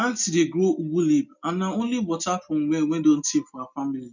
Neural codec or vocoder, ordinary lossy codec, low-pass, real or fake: none; none; 7.2 kHz; real